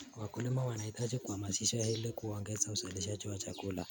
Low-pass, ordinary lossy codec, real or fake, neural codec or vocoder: none; none; real; none